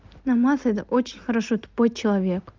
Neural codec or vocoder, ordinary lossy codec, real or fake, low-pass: none; Opus, 24 kbps; real; 7.2 kHz